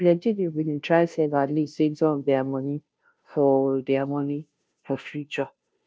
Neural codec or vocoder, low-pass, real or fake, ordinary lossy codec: codec, 16 kHz, 0.5 kbps, FunCodec, trained on Chinese and English, 25 frames a second; none; fake; none